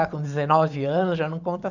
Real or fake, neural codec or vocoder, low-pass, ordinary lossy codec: fake; vocoder, 44.1 kHz, 128 mel bands every 512 samples, BigVGAN v2; 7.2 kHz; none